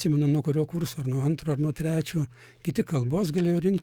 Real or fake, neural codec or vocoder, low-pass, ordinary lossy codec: fake; codec, 44.1 kHz, 7.8 kbps, DAC; 19.8 kHz; Opus, 64 kbps